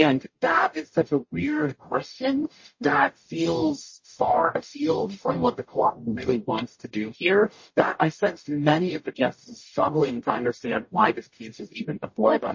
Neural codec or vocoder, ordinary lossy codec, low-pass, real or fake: codec, 44.1 kHz, 0.9 kbps, DAC; MP3, 32 kbps; 7.2 kHz; fake